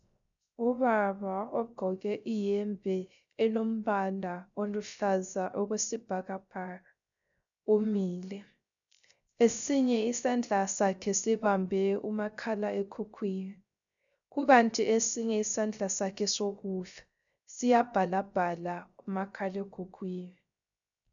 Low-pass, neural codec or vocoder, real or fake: 7.2 kHz; codec, 16 kHz, 0.3 kbps, FocalCodec; fake